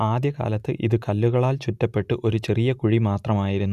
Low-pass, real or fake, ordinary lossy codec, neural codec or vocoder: 14.4 kHz; real; none; none